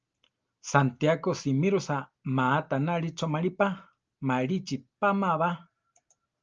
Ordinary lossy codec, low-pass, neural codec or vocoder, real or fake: Opus, 24 kbps; 7.2 kHz; none; real